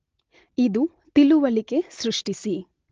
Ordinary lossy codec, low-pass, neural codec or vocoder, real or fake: Opus, 16 kbps; 7.2 kHz; none; real